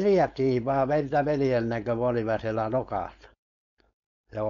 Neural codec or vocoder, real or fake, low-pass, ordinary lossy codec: codec, 16 kHz, 4.8 kbps, FACodec; fake; 7.2 kHz; none